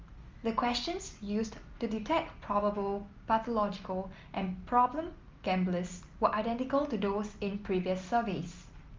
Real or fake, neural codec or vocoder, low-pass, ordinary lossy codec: real; none; 7.2 kHz; Opus, 32 kbps